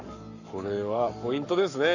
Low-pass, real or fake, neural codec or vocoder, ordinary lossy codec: 7.2 kHz; fake; codec, 44.1 kHz, 7.8 kbps, Pupu-Codec; none